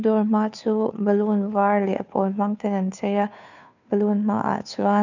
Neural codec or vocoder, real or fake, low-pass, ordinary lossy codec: codec, 16 kHz, 2 kbps, FunCodec, trained on Chinese and English, 25 frames a second; fake; 7.2 kHz; none